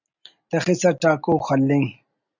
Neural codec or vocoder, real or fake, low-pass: none; real; 7.2 kHz